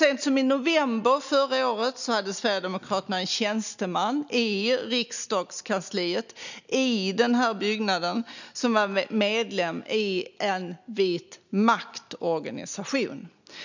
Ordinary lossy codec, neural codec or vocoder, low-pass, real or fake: none; none; 7.2 kHz; real